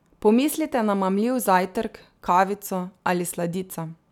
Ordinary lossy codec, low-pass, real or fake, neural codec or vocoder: none; 19.8 kHz; real; none